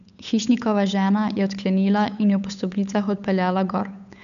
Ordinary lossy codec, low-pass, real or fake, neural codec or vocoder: none; 7.2 kHz; fake; codec, 16 kHz, 8 kbps, FunCodec, trained on Chinese and English, 25 frames a second